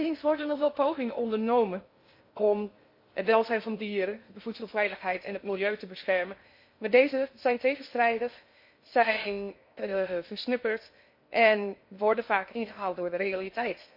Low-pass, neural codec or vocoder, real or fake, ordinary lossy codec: 5.4 kHz; codec, 16 kHz in and 24 kHz out, 0.6 kbps, FocalCodec, streaming, 2048 codes; fake; MP3, 32 kbps